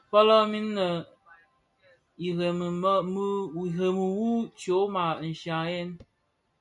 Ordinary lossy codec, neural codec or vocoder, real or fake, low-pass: MP3, 64 kbps; none; real; 10.8 kHz